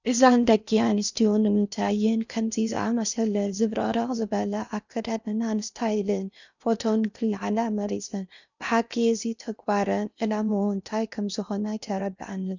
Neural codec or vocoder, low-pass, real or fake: codec, 16 kHz in and 24 kHz out, 0.6 kbps, FocalCodec, streaming, 4096 codes; 7.2 kHz; fake